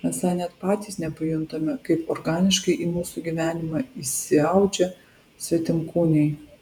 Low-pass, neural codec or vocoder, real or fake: 19.8 kHz; vocoder, 48 kHz, 128 mel bands, Vocos; fake